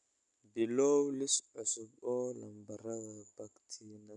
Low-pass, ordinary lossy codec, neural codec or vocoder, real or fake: 10.8 kHz; none; none; real